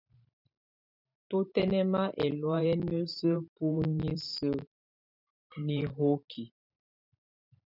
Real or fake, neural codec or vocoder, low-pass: fake; vocoder, 44.1 kHz, 128 mel bands every 512 samples, BigVGAN v2; 5.4 kHz